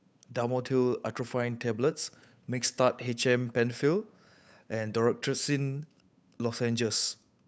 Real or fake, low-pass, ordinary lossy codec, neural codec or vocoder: fake; none; none; codec, 16 kHz, 8 kbps, FunCodec, trained on Chinese and English, 25 frames a second